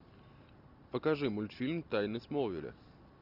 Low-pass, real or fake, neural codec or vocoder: 5.4 kHz; real; none